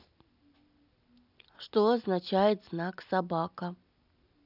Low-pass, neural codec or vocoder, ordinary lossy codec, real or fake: 5.4 kHz; none; none; real